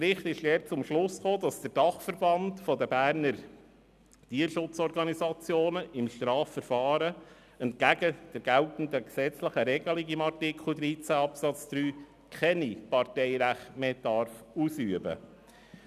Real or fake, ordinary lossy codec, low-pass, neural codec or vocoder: real; none; 14.4 kHz; none